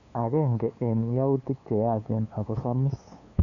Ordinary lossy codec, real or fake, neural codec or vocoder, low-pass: none; fake; codec, 16 kHz, 2 kbps, FunCodec, trained on LibriTTS, 25 frames a second; 7.2 kHz